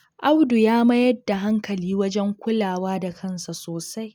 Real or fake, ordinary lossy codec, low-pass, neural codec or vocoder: real; none; none; none